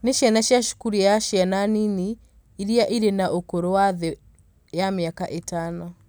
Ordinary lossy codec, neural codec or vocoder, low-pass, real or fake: none; none; none; real